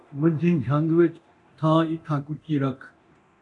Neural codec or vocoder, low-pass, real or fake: codec, 24 kHz, 0.9 kbps, DualCodec; 10.8 kHz; fake